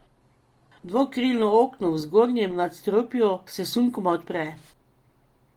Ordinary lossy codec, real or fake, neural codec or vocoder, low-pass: Opus, 24 kbps; real; none; 19.8 kHz